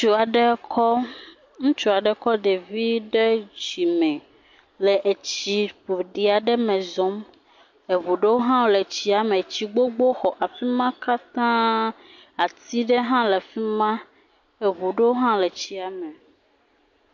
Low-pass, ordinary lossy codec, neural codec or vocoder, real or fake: 7.2 kHz; MP3, 48 kbps; none; real